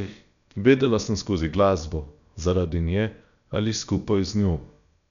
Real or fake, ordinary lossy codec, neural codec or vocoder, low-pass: fake; none; codec, 16 kHz, about 1 kbps, DyCAST, with the encoder's durations; 7.2 kHz